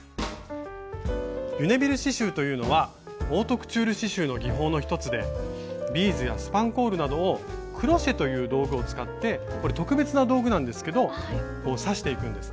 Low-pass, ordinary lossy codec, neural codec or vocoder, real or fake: none; none; none; real